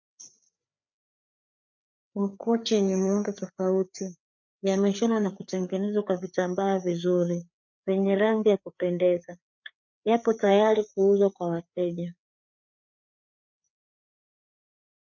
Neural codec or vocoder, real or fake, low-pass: codec, 16 kHz, 4 kbps, FreqCodec, larger model; fake; 7.2 kHz